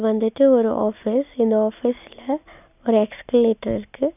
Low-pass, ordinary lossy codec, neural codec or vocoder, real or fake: 3.6 kHz; none; none; real